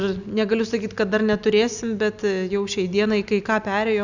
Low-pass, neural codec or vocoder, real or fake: 7.2 kHz; none; real